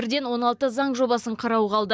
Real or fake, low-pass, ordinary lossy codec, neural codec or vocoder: real; none; none; none